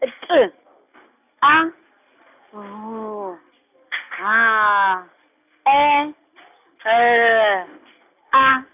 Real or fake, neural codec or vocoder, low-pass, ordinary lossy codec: real; none; 3.6 kHz; none